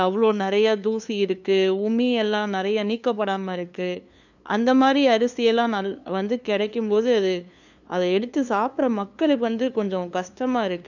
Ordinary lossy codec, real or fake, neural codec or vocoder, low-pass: none; fake; codec, 16 kHz, 2 kbps, FunCodec, trained on LibriTTS, 25 frames a second; 7.2 kHz